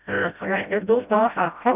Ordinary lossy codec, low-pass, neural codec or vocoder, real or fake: none; 3.6 kHz; codec, 16 kHz, 0.5 kbps, FreqCodec, smaller model; fake